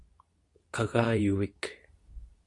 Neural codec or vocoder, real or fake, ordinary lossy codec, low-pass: codec, 24 kHz, 0.9 kbps, WavTokenizer, medium speech release version 2; fake; Opus, 64 kbps; 10.8 kHz